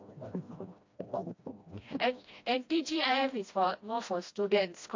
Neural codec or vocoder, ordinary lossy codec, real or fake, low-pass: codec, 16 kHz, 1 kbps, FreqCodec, smaller model; MP3, 48 kbps; fake; 7.2 kHz